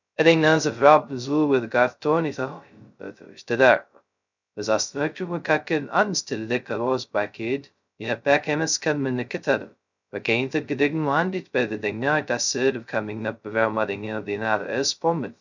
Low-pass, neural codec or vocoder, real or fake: 7.2 kHz; codec, 16 kHz, 0.2 kbps, FocalCodec; fake